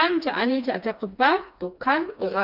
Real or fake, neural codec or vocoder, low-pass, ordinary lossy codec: fake; codec, 16 kHz, 2 kbps, FreqCodec, smaller model; 5.4 kHz; none